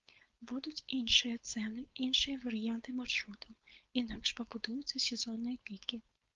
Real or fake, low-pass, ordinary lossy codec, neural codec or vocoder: fake; 7.2 kHz; Opus, 32 kbps; codec, 16 kHz, 4.8 kbps, FACodec